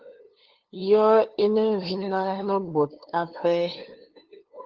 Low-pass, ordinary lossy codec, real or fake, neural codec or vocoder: 7.2 kHz; Opus, 16 kbps; fake; codec, 16 kHz, 2 kbps, FunCodec, trained on LibriTTS, 25 frames a second